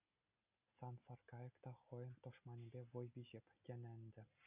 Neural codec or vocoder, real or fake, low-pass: none; real; 3.6 kHz